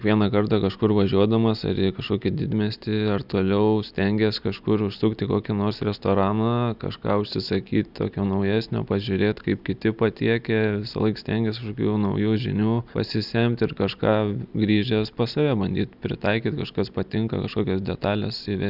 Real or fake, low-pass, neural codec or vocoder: real; 5.4 kHz; none